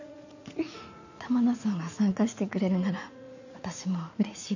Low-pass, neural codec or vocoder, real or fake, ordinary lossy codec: 7.2 kHz; vocoder, 44.1 kHz, 80 mel bands, Vocos; fake; none